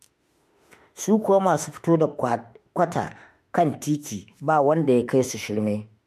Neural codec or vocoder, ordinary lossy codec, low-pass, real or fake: autoencoder, 48 kHz, 32 numbers a frame, DAC-VAE, trained on Japanese speech; MP3, 64 kbps; 14.4 kHz; fake